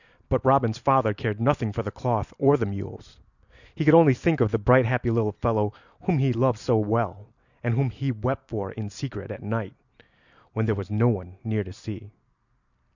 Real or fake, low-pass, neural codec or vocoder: real; 7.2 kHz; none